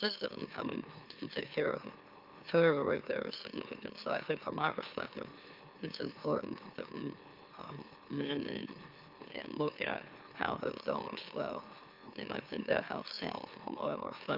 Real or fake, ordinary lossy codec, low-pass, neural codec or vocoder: fake; Opus, 24 kbps; 5.4 kHz; autoencoder, 44.1 kHz, a latent of 192 numbers a frame, MeloTTS